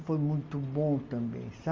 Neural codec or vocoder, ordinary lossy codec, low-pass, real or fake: none; Opus, 32 kbps; 7.2 kHz; real